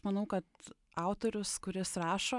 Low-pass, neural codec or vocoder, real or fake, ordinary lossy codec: 10.8 kHz; none; real; MP3, 96 kbps